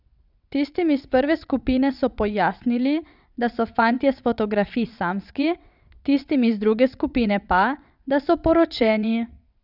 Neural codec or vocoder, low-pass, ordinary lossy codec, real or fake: vocoder, 22.05 kHz, 80 mel bands, WaveNeXt; 5.4 kHz; none; fake